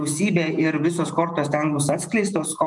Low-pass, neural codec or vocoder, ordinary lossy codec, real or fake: 10.8 kHz; vocoder, 48 kHz, 128 mel bands, Vocos; MP3, 96 kbps; fake